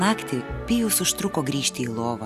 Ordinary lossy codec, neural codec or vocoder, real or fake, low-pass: Opus, 64 kbps; none; real; 14.4 kHz